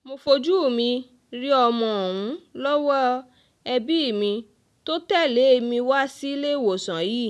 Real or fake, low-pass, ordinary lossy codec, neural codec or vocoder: real; none; none; none